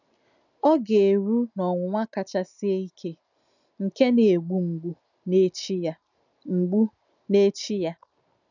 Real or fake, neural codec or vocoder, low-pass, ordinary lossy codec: real; none; 7.2 kHz; none